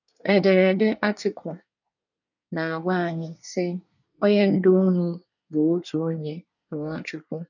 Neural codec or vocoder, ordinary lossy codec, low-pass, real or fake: codec, 24 kHz, 1 kbps, SNAC; none; 7.2 kHz; fake